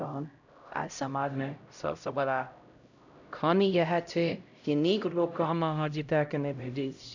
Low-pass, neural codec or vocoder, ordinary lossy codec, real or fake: 7.2 kHz; codec, 16 kHz, 0.5 kbps, X-Codec, HuBERT features, trained on LibriSpeech; none; fake